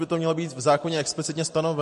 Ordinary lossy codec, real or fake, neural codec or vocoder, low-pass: MP3, 48 kbps; real; none; 14.4 kHz